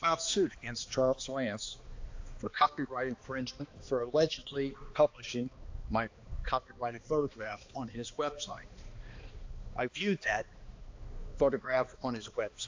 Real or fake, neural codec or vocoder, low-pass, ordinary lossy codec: fake; codec, 16 kHz, 2 kbps, X-Codec, HuBERT features, trained on balanced general audio; 7.2 kHz; AAC, 48 kbps